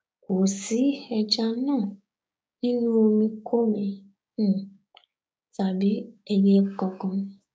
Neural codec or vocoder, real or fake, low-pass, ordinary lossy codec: codec, 16 kHz, 6 kbps, DAC; fake; none; none